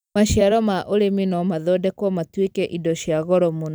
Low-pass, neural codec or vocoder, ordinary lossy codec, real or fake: none; vocoder, 44.1 kHz, 128 mel bands every 256 samples, BigVGAN v2; none; fake